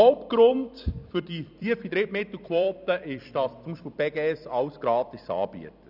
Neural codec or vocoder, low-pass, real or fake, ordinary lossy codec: vocoder, 44.1 kHz, 128 mel bands every 256 samples, BigVGAN v2; 5.4 kHz; fake; none